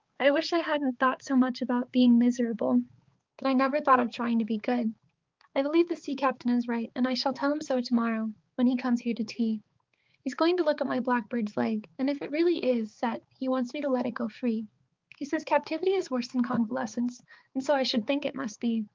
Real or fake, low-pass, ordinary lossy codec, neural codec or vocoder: fake; 7.2 kHz; Opus, 32 kbps; codec, 16 kHz, 4 kbps, X-Codec, HuBERT features, trained on balanced general audio